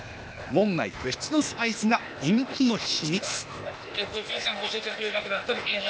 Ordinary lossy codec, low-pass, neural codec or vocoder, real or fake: none; none; codec, 16 kHz, 0.8 kbps, ZipCodec; fake